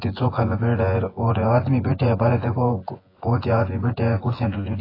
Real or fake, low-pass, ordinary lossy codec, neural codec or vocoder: fake; 5.4 kHz; AAC, 24 kbps; vocoder, 24 kHz, 100 mel bands, Vocos